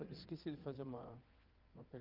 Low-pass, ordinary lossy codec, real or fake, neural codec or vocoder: 5.4 kHz; Opus, 32 kbps; fake; vocoder, 22.05 kHz, 80 mel bands, WaveNeXt